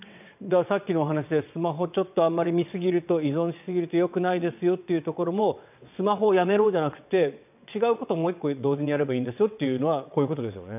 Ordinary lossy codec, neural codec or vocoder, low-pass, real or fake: none; vocoder, 22.05 kHz, 80 mel bands, WaveNeXt; 3.6 kHz; fake